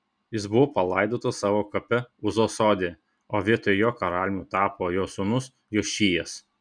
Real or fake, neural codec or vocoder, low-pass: real; none; 9.9 kHz